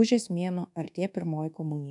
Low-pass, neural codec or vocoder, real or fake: 10.8 kHz; codec, 24 kHz, 1.2 kbps, DualCodec; fake